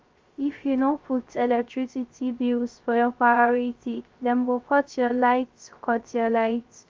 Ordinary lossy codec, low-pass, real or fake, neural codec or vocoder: Opus, 32 kbps; 7.2 kHz; fake; codec, 16 kHz, 0.3 kbps, FocalCodec